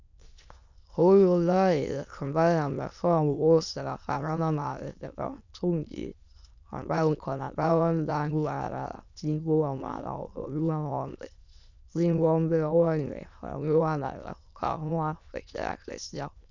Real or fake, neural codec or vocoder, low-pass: fake; autoencoder, 22.05 kHz, a latent of 192 numbers a frame, VITS, trained on many speakers; 7.2 kHz